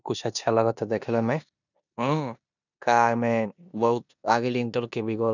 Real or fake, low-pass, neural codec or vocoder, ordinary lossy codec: fake; 7.2 kHz; codec, 16 kHz in and 24 kHz out, 0.9 kbps, LongCat-Audio-Codec, four codebook decoder; none